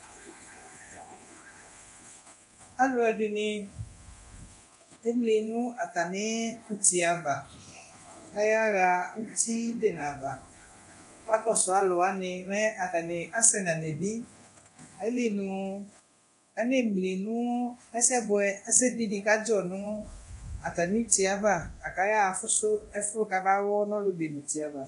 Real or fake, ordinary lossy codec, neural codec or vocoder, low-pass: fake; AAC, 64 kbps; codec, 24 kHz, 0.9 kbps, DualCodec; 10.8 kHz